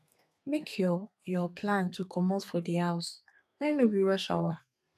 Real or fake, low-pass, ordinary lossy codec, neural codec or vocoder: fake; 14.4 kHz; none; codec, 32 kHz, 1.9 kbps, SNAC